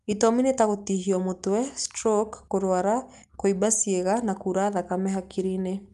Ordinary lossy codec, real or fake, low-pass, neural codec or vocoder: Opus, 32 kbps; real; 10.8 kHz; none